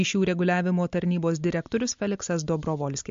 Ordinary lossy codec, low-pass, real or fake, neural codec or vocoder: MP3, 48 kbps; 7.2 kHz; real; none